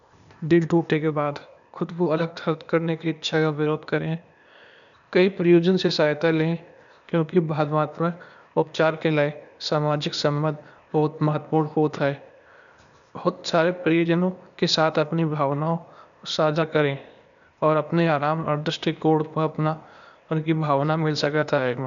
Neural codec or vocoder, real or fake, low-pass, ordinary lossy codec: codec, 16 kHz, 0.8 kbps, ZipCodec; fake; 7.2 kHz; none